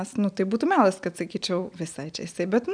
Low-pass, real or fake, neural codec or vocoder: 9.9 kHz; real; none